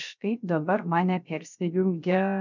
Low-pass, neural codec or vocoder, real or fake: 7.2 kHz; codec, 16 kHz, 0.3 kbps, FocalCodec; fake